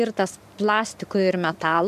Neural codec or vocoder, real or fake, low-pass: vocoder, 44.1 kHz, 128 mel bands every 512 samples, BigVGAN v2; fake; 14.4 kHz